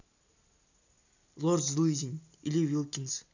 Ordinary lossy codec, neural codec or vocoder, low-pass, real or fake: none; none; 7.2 kHz; real